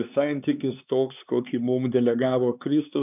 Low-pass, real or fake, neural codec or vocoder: 3.6 kHz; fake; codec, 16 kHz, 4 kbps, X-Codec, HuBERT features, trained on LibriSpeech